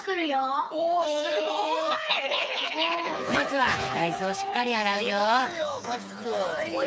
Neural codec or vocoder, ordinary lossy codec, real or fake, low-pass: codec, 16 kHz, 4 kbps, FreqCodec, smaller model; none; fake; none